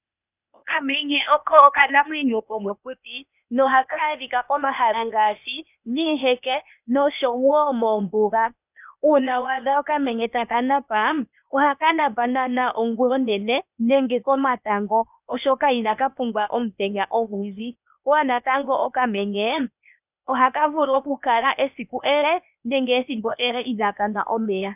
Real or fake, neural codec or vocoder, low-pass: fake; codec, 16 kHz, 0.8 kbps, ZipCodec; 3.6 kHz